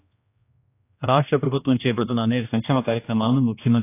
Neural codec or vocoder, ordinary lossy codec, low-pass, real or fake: codec, 16 kHz, 1 kbps, X-Codec, HuBERT features, trained on general audio; AAC, 24 kbps; 3.6 kHz; fake